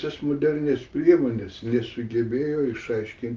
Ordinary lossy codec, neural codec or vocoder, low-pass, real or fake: Opus, 32 kbps; none; 7.2 kHz; real